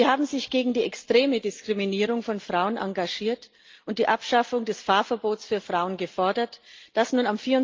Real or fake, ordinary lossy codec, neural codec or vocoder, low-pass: real; Opus, 24 kbps; none; 7.2 kHz